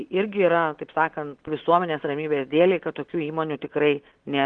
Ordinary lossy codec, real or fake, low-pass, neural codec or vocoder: Opus, 16 kbps; real; 9.9 kHz; none